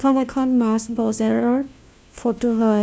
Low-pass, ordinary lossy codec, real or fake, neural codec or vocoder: none; none; fake; codec, 16 kHz, 1 kbps, FunCodec, trained on LibriTTS, 50 frames a second